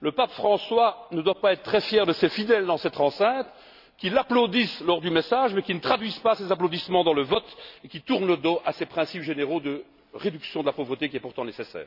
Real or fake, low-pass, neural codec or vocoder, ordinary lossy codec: real; 5.4 kHz; none; none